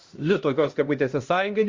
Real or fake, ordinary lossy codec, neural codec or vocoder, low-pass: fake; Opus, 32 kbps; codec, 16 kHz, 1 kbps, X-Codec, HuBERT features, trained on LibriSpeech; 7.2 kHz